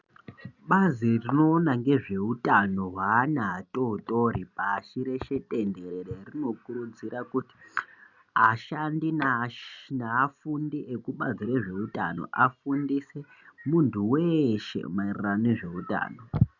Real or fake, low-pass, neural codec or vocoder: real; 7.2 kHz; none